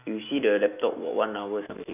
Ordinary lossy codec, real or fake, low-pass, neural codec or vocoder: none; fake; 3.6 kHz; autoencoder, 48 kHz, 128 numbers a frame, DAC-VAE, trained on Japanese speech